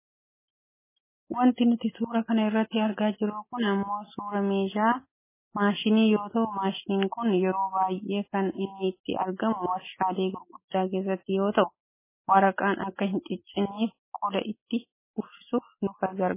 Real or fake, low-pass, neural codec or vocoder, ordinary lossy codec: real; 3.6 kHz; none; MP3, 16 kbps